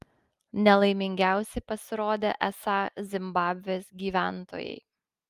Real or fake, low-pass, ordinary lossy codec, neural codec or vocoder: real; 14.4 kHz; Opus, 32 kbps; none